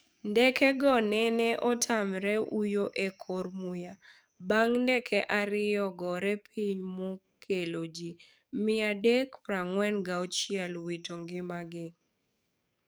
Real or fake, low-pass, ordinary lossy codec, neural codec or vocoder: fake; none; none; codec, 44.1 kHz, 7.8 kbps, DAC